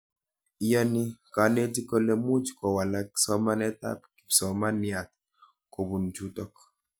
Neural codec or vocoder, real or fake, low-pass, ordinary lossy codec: none; real; none; none